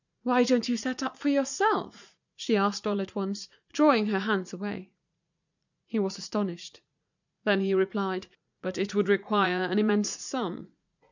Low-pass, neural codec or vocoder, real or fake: 7.2 kHz; vocoder, 44.1 kHz, 80 mel bands, Vocos; fake